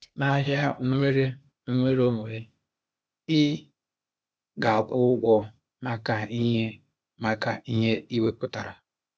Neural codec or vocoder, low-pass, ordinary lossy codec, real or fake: codec, 16 kHz, 0.8 kbps, ZipCodec; none; none; fake